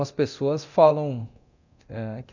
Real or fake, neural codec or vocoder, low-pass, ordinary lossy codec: fake; codec, 24 kHz, 0.9 kbps, DualCodec; 7.2 kHz; none